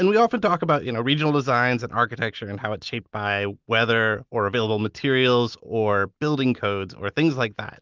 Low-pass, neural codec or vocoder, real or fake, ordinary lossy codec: 7.2 kHz; none; real; Opus, 32 kbps